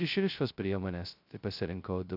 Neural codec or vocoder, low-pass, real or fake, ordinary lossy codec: codec, 16 kHz, 0.2 kbps, FocalCodec; 5.4 kHz; fake; AAC, 48 kbps